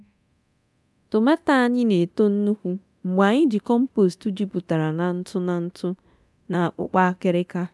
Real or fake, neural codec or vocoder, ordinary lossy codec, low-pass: fake; codec, 24 kHz, 0.9 kbps, DualCodec; none; none